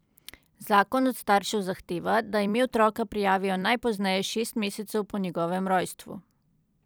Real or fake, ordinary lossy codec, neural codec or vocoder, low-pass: fake; none; vocoder, 44.1 kHz, 128 mel bands every 256 samples, BigVGAN v2; none